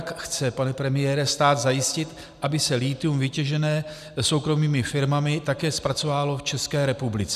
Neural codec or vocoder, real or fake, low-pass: none; real; 14.4 kHz